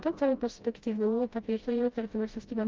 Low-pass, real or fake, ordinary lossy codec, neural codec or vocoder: 7.2 kHz; fake; Opus, 32 kbps; codec, 16 kHz, 0.5 kbps, FreqCodec, smaller model